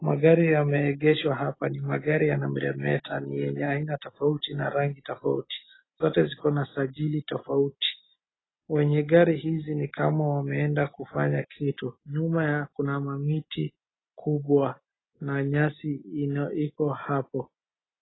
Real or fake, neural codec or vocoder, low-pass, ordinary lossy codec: real; none; 7.2 kHz; AAC, 16 kbps